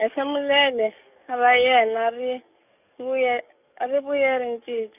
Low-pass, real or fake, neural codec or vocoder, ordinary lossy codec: 3.6 kHz; fake; codec, 44.1 kHz, 7.8 kbps, DAC; none